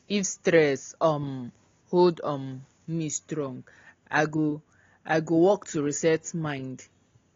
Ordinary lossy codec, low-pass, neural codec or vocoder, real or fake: AAC, 32 kbps; 7.2 kHz; none; real